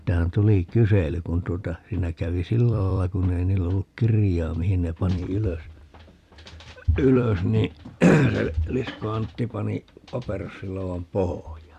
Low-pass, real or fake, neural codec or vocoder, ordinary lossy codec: 14.4 kHz; fake; vocoder, 44.1 kHz, 128 mel bands every 256 samples, BigVGAN v2; Opus, 64 kbps